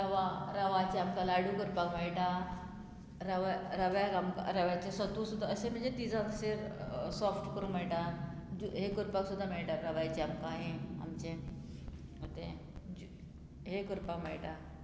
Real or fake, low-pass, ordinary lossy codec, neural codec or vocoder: real; none; none; none